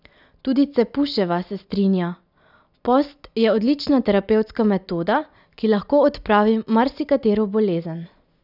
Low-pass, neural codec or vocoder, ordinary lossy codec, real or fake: 5.4 kHz; none; none; real